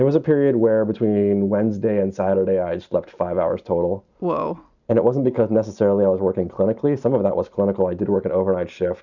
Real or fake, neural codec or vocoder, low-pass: real; none; 7.2 kHz